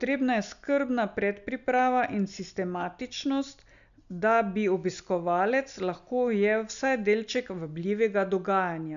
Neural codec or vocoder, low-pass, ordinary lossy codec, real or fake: none; 7.2 kHz; none; real